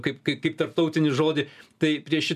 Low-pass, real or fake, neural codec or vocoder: 14.4 kHz; real; none